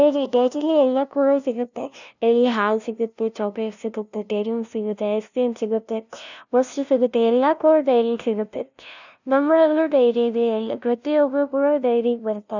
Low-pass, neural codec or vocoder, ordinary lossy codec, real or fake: 7.2 kHz; codec, 16 kHz, 0.5 kbps, FunCodec, trained on LibriTTS, 25 frames a second; none; fake